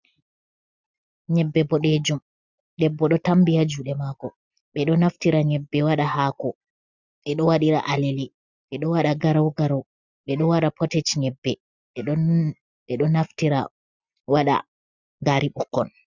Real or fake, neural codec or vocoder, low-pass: real; none; 7.2 kHz